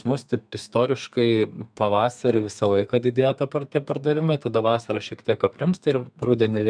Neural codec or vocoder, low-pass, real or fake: codec, 32 kHz, 1.9 kbps, SNAC; 9.9 kHz; fake